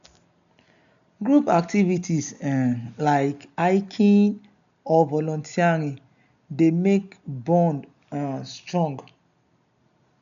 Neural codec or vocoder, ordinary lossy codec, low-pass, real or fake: none; none; 7.2 kHz; real